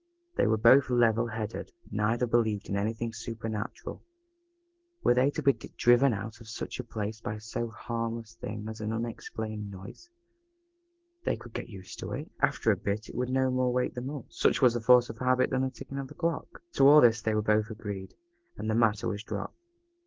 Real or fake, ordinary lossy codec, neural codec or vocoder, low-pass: real; Opus, 16 kbps; none; 7.2 kHz